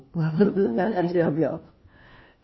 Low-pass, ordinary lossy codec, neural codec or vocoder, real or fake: 7.2 kHz; MP3, 24 kbps; codec, 16 kHz, 1 kbps, FunCodec, trained on LibriTTS, 50 frames a second; fake